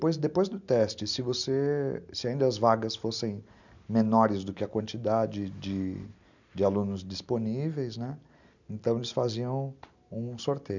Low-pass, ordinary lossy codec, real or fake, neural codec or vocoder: 7.2 kHz; none; real; none